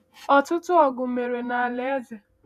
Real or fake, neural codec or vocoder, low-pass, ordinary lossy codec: fake; vocoder, 48 kHz, 128 mel bands, Vocos; 14.4 kHz; none